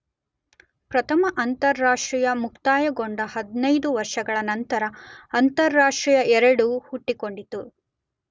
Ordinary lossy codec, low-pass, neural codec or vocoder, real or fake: none; none; none; real